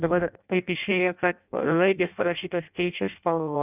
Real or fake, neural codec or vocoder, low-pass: fake; codec, 16 kHz in and 24 kHz out, 0.6 kbps, FireRedTTS-2 codec; 3.6 kHz